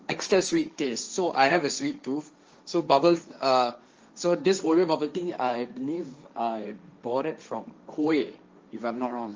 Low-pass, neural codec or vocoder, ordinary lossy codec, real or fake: 7.2 kHz; codec, 16 kHz, 1.1 kbps, Voila-Tokenizer; Opus, 24 kbps; fake